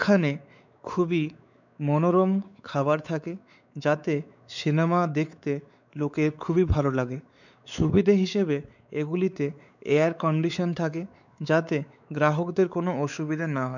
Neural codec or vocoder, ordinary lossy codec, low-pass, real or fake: codec, 16 kHz, 4 kbps, X-Codec, WavLM features, trained on Multilingual LibriSpeech; none; 7.2 kHz; fake